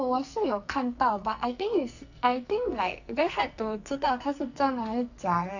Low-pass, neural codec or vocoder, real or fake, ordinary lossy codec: 7.2 kHz; codec, 44.1 kHz, 2.6 kbps, SNAC; fake; none